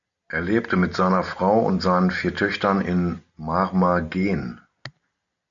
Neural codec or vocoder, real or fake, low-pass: none; real; 7.2 kHz